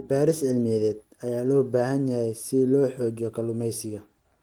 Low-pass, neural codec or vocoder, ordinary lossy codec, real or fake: 19.8 kHz; none; Opus, 24 kbps; real